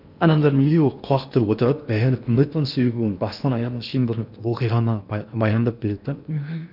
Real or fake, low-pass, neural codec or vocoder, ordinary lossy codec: fake; 5.4 kHz; codec, 16 kHz in and 24 kHz out, 0.8 kbps, FocalCodec, streaming, 65536 codes; none